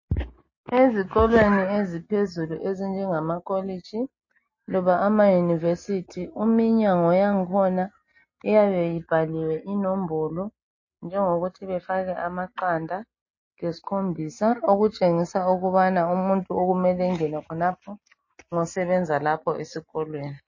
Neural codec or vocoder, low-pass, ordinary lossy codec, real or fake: none; 7.2 kHz; MP3, 32 kbps; real